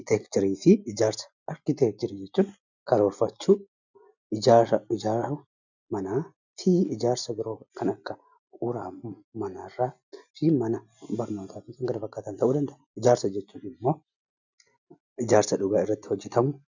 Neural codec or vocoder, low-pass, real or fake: none; 7.2 kHz; real